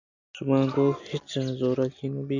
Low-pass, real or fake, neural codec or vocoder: 7.2 kHz; real; none